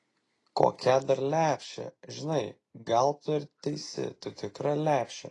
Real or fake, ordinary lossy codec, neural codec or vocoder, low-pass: real; AAC, 32 kbps; none; 10.8 kHz